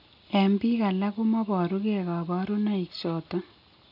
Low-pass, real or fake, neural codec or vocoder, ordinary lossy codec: 5.4 kHz; real; none; none